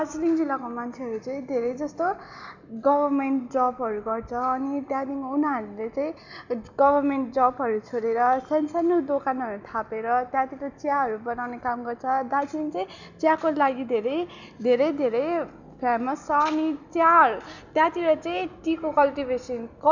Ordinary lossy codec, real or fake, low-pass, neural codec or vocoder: none; real; 7.2 kHz; none